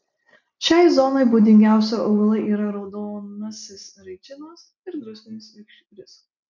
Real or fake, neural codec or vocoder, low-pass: real; none; 7.2 kHz